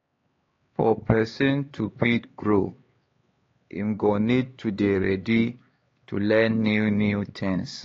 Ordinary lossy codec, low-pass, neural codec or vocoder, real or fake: AAC, 32 kbps; 7.2 kHz; codec, 16 kHz, 4 kbps, X-Codec, HuBERT features, trained on LibriSpeech; fake